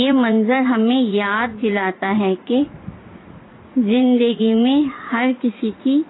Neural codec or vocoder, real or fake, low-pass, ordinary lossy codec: vocoder, 44.1 kHz, 80 mel bands, Vocos; fake; 7.2 kHz; AAC, 16 kbps